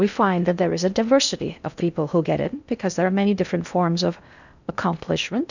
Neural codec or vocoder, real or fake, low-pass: codec, 16 kHz in and 24 kHz out, 0.6 kbps, FocalCodec, streaming, 4096 codes; fake; 7.2 kHz